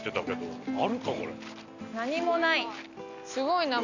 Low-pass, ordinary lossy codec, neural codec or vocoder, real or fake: 7.2 kHz; none; none; real